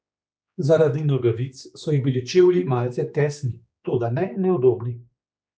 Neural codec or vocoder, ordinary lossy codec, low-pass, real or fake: codec, 16 kHz, 2 kbps, X-Codec, HuBERT features, trained on general audio; none; none; fake